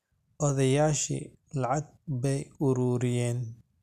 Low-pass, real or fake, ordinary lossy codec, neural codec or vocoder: 14.4 kHz; real; none; none